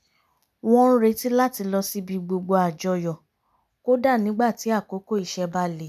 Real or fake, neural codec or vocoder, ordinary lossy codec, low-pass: real; none; none; 14.4 kHz